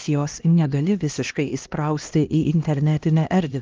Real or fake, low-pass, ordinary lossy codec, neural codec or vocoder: fake; 7.2 kHz; Opus, 32 kbps; codec, 16 kHz, 1 kbps, X-Codec, HuBERT features, trained on LibriSpeech